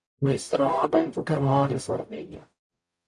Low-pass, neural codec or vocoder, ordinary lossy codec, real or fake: 10.8 kHz; codec, 44.1 kHz, 0.9 kbps, DAC; AAC, 64 kbps; fake